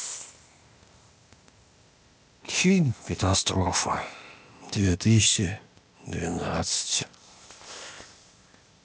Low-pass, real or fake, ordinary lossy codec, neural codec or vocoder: none; fake; none; codec, 16 kHz, 0.8 kbps, ZipCodec